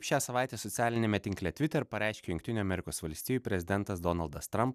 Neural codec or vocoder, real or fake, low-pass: none; real; 14.4 kHz